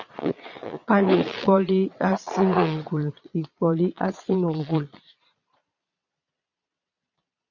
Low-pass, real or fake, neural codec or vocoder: 7.2 kHz; fake; vocoder, 22.05 kHz, 80 mel bands, Vocos